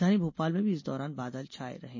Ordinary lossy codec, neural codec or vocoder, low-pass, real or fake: MP3, 32 kbps; none; 7.2 kHz; real